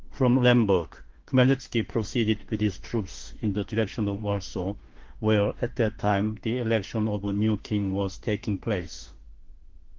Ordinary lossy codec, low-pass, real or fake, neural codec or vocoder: Opus, 16 kbps; 7.2 kHz; fake; autoencoder, 48 kHz, 32 numbers a frame, DAC-VAE, trained on Japanese speech